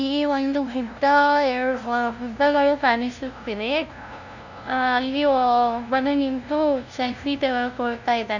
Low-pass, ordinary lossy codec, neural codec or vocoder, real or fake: 7.2 kHz; none; codec, 16 kHz, 0.5 kbps, FunCodec, trained on LibriTTS, 25 frames a second; fake